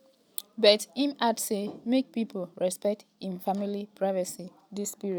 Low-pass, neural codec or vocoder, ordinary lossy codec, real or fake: none; none; none; real